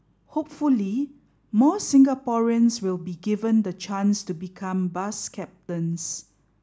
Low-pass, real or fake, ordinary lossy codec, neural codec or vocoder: none; real; none; none